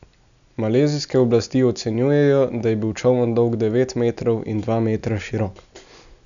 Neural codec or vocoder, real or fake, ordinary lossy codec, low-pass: none; real; none; 7.2 kHz